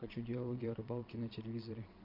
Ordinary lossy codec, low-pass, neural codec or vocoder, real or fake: MP3, 48 kbps; 5.4 kHz; vocoder, 22.05 kHz, 80 mel bands, Vocos; fake